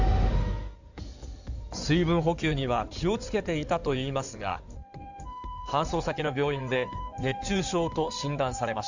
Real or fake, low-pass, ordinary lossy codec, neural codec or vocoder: fake; 7.2 kHz; none; codec, 16 kHz in and 24 kHz out, 2.2 kbps, FireRedTTS-2 codec